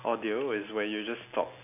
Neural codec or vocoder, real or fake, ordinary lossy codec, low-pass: none; real; none; 3.6 kHz